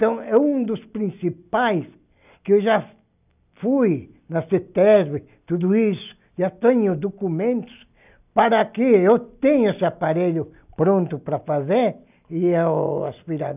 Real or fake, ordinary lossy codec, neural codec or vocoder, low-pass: real; none; none; 3.6 kHz